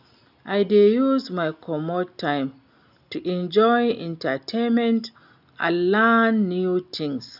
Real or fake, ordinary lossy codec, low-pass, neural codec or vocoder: real; none; 5.4 kHz; none